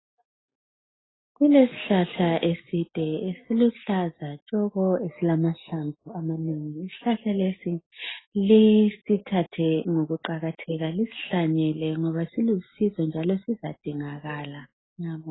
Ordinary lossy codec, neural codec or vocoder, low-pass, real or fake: AAC, 16 kbps; none; 7.2 kHz; real